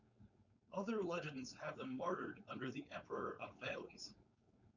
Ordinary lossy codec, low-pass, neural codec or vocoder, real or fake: Opus, 64 kbps; 7.2 kHz; codec, 16 kHz, 4.8 kbps, FACodec; fake